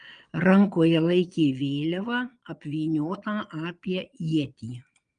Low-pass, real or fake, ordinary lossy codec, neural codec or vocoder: 9.9 kHz; fake; Opus, 32 kbps; vocoder, 22.05 kHz, 80 mel bands, Vocos